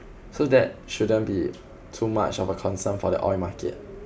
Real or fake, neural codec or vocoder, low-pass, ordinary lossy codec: real; none; none; none